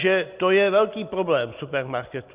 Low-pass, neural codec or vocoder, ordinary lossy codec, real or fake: 3.6 kHz; none; Opus, 64 kbps; real